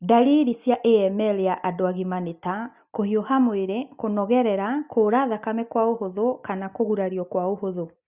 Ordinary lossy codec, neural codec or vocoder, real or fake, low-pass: Opus, 64 kbps; none; real; 3.6 kHz